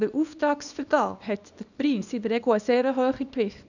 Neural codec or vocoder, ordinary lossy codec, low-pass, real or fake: codec, 24 kHz, 0.9 kbps, WavTokenizer, small release; none; 7.2 kHz; fake